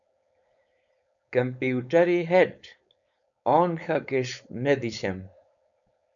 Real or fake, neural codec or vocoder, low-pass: fake; codec, 16 kHz, 4.8 kbps, FACodec; 7.2 kHz